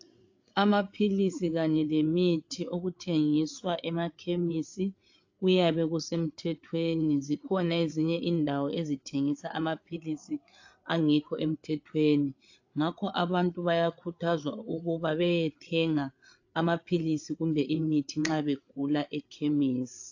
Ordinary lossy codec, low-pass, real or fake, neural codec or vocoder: AAC, 48 kbps; 7.2 kHz; fake; codec, 16 kHz, 8 kbps, FreqCodec, larger model